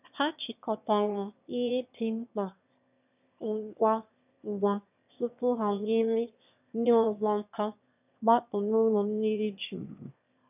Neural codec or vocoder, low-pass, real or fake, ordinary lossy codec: autoencoder, 22.05 kHz, a latent of 192 numbers a frame, VITS, trained on one speaker; 3.6 kHz; fake; none